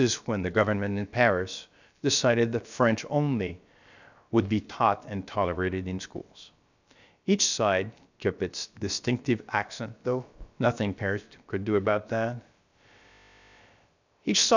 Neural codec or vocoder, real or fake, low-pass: codec, 16 kHz, about 1 kbps, DyCAST, with the encoder's durations; fake; 7.2 kHz